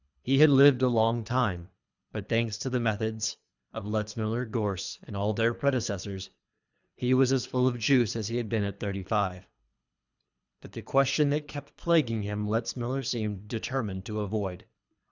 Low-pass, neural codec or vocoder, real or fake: 7.2 kHz; codec, 24 kHz, 3 kbps, HILCodec; fake